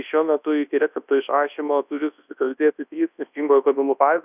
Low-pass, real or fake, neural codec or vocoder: 3.6 kHz; fake; codec, 24 kHz, 0.9 kbps, WavTokenizer, large speech release